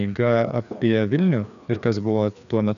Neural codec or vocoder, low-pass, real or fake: codec, 16 kHz, 2 kbps, FreqCodec, larger model; 7.2 kHz; fake